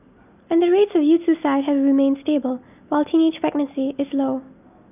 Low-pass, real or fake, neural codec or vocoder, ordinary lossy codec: 3.6 kHz; real; none; none